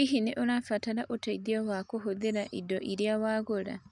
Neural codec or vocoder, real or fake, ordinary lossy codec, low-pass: none; real; none; 10.8 kHz